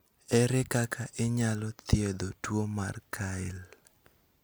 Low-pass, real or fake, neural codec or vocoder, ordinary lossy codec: none; real; none; none